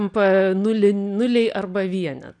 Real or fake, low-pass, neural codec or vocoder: real; 9.9 kHz; none